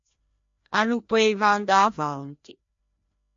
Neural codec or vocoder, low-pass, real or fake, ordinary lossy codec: codec, 16 kHz, 1 kbps, FreqCodec, larger model; 7.2 kHz; fake; MP3, 48 kbps